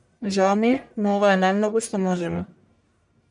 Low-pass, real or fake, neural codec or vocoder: 10.8 kHz; fake; codec, 44.1 kHz, 1.7 kbps, Pupu-Codec